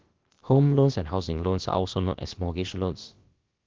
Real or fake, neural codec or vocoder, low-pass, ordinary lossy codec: fake; codec, 16 kHz, about 1 kbps, DyCAST, with the encoder's durations; 7.2 kHz; Opus, 16 kbps